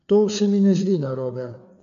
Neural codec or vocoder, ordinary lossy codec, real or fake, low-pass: codec, 16 kHz, 4 kbps, FreqCodec, larger model; none; fake; 7.2 kHz